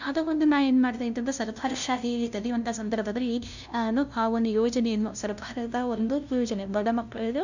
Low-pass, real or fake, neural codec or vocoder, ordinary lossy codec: 7.2 kHz; fake; codec, 16 kHz, 0.5 kbps, FunCodec, trained on LibriTTS, 25 frames a second; none